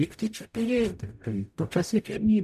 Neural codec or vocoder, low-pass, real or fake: codec, 44.1 kHz, 0.9 kbps, DAC; 14.4 kHz; fake